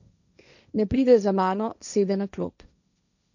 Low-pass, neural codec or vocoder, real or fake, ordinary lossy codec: 7.2 kHz; codec, 16 kHz, 1.1 kbps, Voila-Tokenizer; fake; none